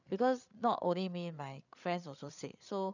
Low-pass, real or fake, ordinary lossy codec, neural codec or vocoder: 7.2 kHz; fake; none; codec, 16 kHz, 8 kbps, FreqCodec, larger model